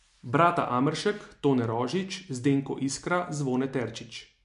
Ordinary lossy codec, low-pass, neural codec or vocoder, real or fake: MP3, 64 kbps; 10.8 kHz; none; real